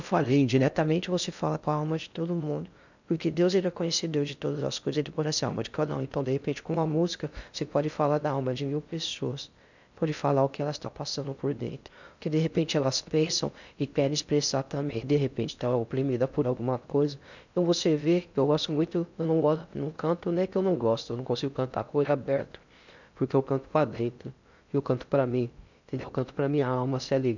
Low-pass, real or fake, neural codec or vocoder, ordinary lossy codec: 7.2 kHz; fake; codec, 16 kHz in and 24 kHz out, 0.6 kbps, FocalCodec, streaming, 2048 codes; none